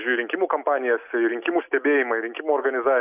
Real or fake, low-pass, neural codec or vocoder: real; 3.6 kHz; none